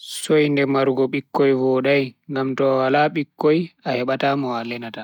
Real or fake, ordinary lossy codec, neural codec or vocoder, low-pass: real; none; none; 19.8 kHz